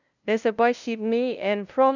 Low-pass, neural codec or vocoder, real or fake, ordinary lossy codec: 7.2 kHz; codec, 16 kHz, 0.5 kbps, FunCodec, trained on LibriTTS, 25 frames a second; fake; none